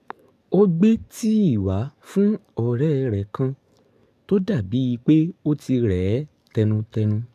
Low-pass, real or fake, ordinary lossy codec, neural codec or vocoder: 14.4 kHz; fake; none; codec, 44.1 kHz, 7.8 kbps, DAC